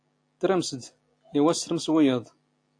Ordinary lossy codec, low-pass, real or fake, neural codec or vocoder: AAC, 48 kbps; 9.9 kHz; real; none